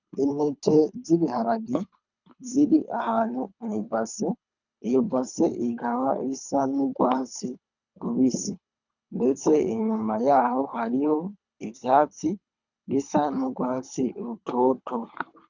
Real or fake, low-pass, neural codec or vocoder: fake; 7.2 kHz; codec, 24 kHz, 3 kbps, HILCodec